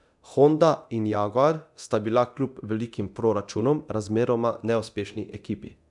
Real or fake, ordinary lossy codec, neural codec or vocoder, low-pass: fake; none; codec, 24 kHz, 0.9 kbps, DualCodec; 10.8 kHz